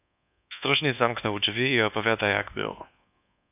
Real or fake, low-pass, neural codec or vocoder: fake; 3.6 kHz; codec, 24 kHz, 1.2 kbps, DualCodec